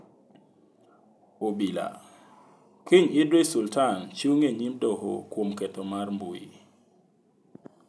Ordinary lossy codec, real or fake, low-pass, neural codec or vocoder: none; real; none; none